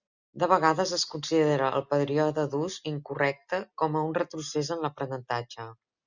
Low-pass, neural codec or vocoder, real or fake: 7.2 kHz; none; real